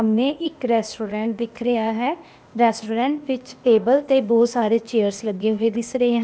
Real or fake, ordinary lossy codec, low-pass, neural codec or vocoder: fake; none; none; codec, 16 kHz, 0.8 kbps, ZipCodec